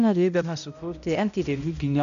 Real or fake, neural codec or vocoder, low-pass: fake; codec, 16 kHz, 0.5 kbps, X-Codec, HuBERT features, trained on balanced general audio; 7.2 kHz